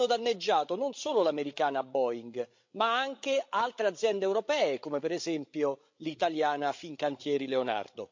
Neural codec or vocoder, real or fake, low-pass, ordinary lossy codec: codec, 16 kHz, 16 kbps, FreqCodec, larger model; fake; 7.2 kHz; MP3, 48 kbps